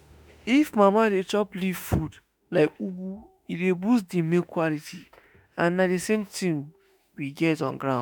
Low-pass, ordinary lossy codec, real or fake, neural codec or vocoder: none; none; fake; autoencoder, 48 kHz, 32 numbers a frame, DAC-VAE, trained on Japanese speech